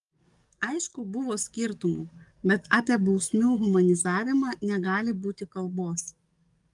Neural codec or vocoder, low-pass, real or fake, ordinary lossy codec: codec, 44.1 kHz, 7.8 kbps, DAC; 10.8 kHz; fake; Opus, 32 kbps